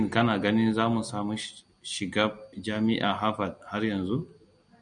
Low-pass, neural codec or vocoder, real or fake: 9.9 kHz; none; real